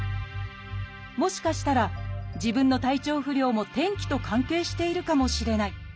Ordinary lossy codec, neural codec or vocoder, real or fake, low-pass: none; none; real; none